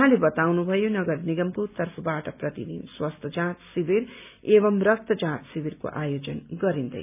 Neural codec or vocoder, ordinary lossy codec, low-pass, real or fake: none; none; 3.6 kHz; real